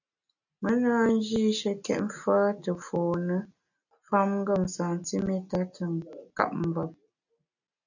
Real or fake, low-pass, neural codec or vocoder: real; 7.2 kHz; none